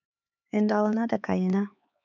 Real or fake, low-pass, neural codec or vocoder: fake; 7.2 kHz; codec, 16 kHz, 4 kbps, X-Codec, HuBERT features, trained on LibriSpeech